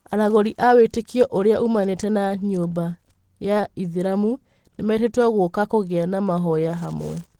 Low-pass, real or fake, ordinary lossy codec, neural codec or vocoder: 19.8 kHz; real; Opus, 16 kbps; none